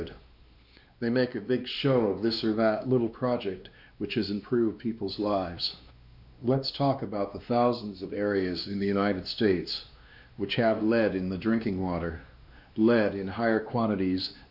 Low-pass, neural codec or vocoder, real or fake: 5.4 kHz; codec, 16 kHz, 2 kbps, X-Codec, WavLM features, trained on Multilingual LibriSpeech; fake